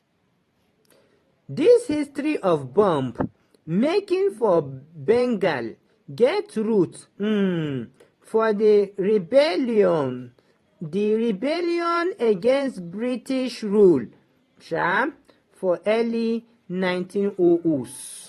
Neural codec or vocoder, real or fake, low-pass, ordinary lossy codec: vocoder, 44.1 kHz, 128 mel bands every 512 samples, BigVGAN v2; fake; 19.8 kHz; AAC, 32 kbps